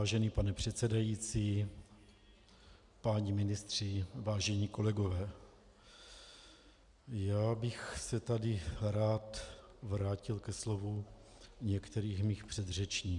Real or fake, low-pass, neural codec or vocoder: real; 10.8 kHz; none